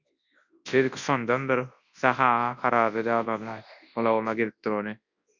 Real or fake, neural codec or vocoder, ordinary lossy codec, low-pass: fake; codec, 24 kHz, 0.9 kbps, WavTokenizer, large speech release; Opus, 64 kbps; 7.2 kHz